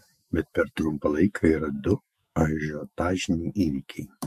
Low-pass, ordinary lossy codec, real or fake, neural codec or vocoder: 14.4 kHz; MP3, 64 kbps; fake; autoencoder, 48 kHz, 128 numbers a frame, DAC-VAE, trained on Japanese speech